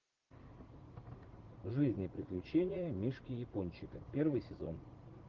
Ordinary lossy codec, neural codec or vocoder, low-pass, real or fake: Opus, 16 kbps; vocoder, 44.1 kHz, 80 mel bands, Vocos; 7.2 kHz; fake